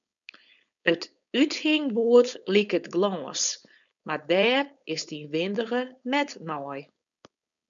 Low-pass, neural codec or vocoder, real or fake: 7.2 kHz; codec, 16 kHz, 4.8 kbps, FACodec; fake